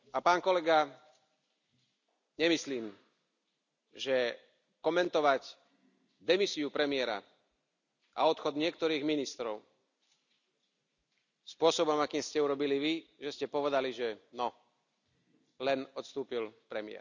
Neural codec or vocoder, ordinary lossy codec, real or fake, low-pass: none; none; real; 7.2 kHz